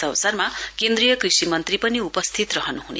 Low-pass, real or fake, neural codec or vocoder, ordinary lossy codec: none; real; none; none